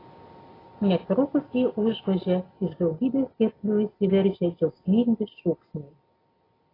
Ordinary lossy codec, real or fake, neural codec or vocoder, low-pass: AAC, 24 kbps; real; none; 5.4 kHz